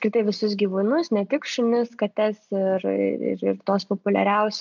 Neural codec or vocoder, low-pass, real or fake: none; 7.2 kHz; real